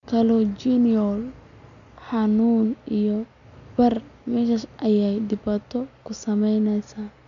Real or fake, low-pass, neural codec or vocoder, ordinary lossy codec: real; 7.2 kHz; none; none